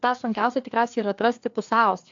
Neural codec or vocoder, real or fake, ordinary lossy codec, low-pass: codec, 16 kHz, 2 kbps, FreqCodec, larger model; fake; AAC, 64 kbps; 7.2 kHz